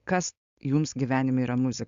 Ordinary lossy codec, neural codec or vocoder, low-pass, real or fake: Opus, 64 kbps; codec, 16 kHz, 4.8 kbps, FACodec; 7.2 kHz; fake